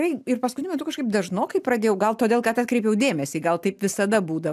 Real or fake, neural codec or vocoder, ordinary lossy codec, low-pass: real; none; AAC, 96 kbps; 14.4 kHz